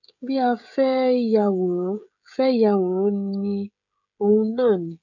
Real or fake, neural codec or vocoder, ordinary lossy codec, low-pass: fake; codec, 16 kHz, 16 kbps, FreqCodec, smaller model; none; 7.2 kHz